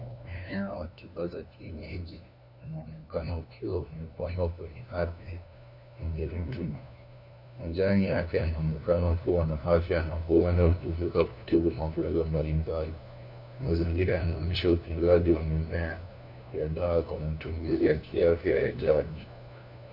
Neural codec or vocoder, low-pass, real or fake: codec, 16 kHz, 1 kbps, FunCodec, trained on LibriTTS, 50 frames a second; 5.4 kHz; fake